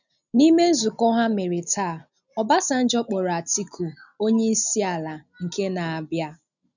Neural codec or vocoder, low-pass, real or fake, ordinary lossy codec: none; 7.2 kHz; real; none